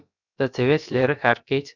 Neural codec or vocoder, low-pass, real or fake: codec, 16 kHz, about 1 kbps, DyCAST, with the encoder's durations; 7.2 kHz; fake